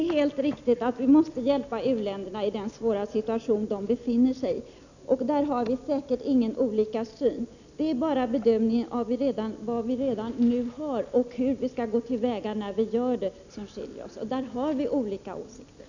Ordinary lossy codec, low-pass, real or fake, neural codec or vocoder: none; 7.2 kHz; real; none